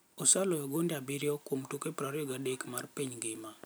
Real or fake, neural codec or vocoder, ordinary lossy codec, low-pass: real; none; none; none